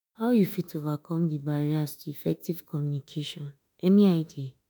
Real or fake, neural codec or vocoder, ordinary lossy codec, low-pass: fake; autoencoder, 48 kHz, 32 numbers a frame, DAC-VAE, trained on Japanese speech; none; none